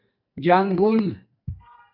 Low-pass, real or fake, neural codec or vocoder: 5.4 kHz; fake; codec, 44.1 kHz, 2.6 kbps, SNAC